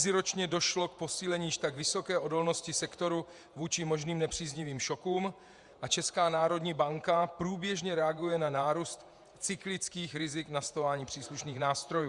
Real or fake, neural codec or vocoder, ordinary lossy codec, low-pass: fake; vocoder, 48 kHz, 128 mel bands, Vocos; Opus, 64 kbps; 10.8 kHz